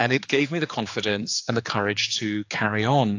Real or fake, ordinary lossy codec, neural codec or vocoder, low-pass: fake; AAC, 48 kbps; codec, 16 kHz, 4 kbps, X-Codec, HuBERT features, trained on general audio; 7.2 kHz